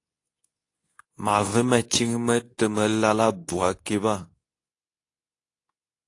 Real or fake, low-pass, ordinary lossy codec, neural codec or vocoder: fake; 10.8 kHz; AAC, 48 kbps; codec, 24 kHz, 0.9 kbps, WavTokenizer, medium speech release version 2